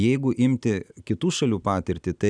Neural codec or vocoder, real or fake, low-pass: none; real; 9.9 kHz